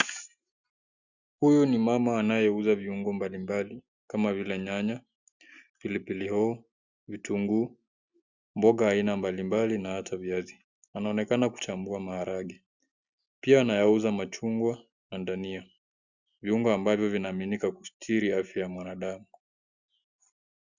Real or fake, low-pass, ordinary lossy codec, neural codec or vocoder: real; 7.2 kHz; Opus, 64 kbps; none